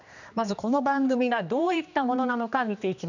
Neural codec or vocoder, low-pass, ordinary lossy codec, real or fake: codec, 16 kHz, 2 kbps, X-Codec, HuBERT features, trained on general audio; 7.2 kHz; none; fake